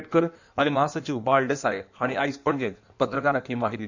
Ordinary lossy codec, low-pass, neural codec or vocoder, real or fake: none; 7.2 kHz; codec, 16 kHz in and 24 kHz out, 1.1 kbps, FireRedTTS-2 codec; fake